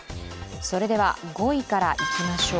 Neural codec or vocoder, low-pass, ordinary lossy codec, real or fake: none; none; none; real